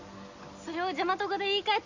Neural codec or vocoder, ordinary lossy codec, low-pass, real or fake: none; Opus, 64 kbps; 7.2 kHz; real